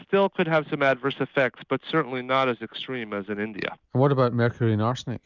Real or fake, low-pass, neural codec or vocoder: real; 7.2 kHz; none